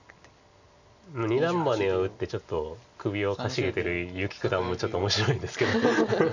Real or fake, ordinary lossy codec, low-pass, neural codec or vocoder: real; none; 7.2 kHz; none